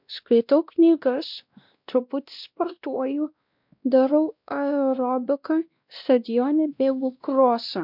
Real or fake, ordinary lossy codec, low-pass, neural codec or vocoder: fake; MP3, 48 kbps; 5.4 kHz; codec, 16 kHz, 1 kbps, X-Codec, WavLM features, trained on Multilingual LibriSpeech